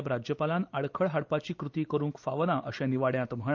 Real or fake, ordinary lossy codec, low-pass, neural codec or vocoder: real; Opus, 24 kbps; 7.2 kHz; none